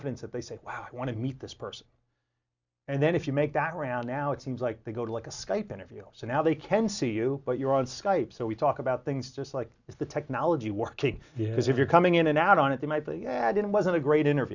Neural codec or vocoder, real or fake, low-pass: none; real; 7.2 kHz